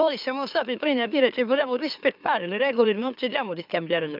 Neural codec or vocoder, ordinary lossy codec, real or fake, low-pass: autoencoder, 44.1 kHz, a latent of 192 numbers a frame, MeloTTS; none; fake; 5.4 kHz